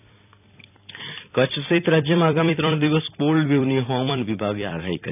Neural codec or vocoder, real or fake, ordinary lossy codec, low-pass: vocoder, 44.1 kHz, 128 mel bands every 256 samples, BigVGAN v2; fake; none; 3.6 kHz